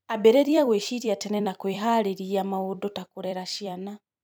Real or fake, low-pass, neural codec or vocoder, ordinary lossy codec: fake; none; vocoder, 44.1 kHz, 128 mel bands every 256 samples, BigVGAN v2; none